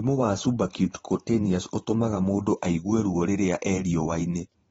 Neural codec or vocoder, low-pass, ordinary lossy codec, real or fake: vocoder, 44.1 kHz, 128 mel bands every 512 samples, BigVGAN v2; 19.8 kHz; AAC, 24 kbps; fake